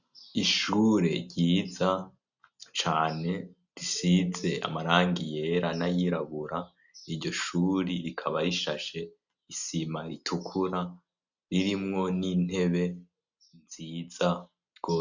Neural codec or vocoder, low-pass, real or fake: none; 7.2 kHz; real